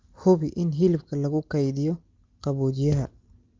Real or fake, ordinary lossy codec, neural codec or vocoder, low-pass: real; Opus, 32 kbps; none; 7.2 kHz